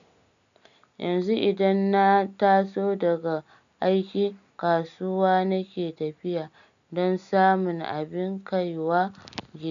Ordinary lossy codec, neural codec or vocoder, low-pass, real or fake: none; none; 7.2 kHz; real